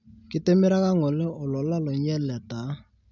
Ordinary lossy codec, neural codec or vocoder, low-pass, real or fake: none; none; 7.2 kHz; real